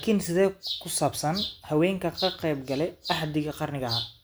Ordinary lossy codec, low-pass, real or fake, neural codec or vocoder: none; none; real; none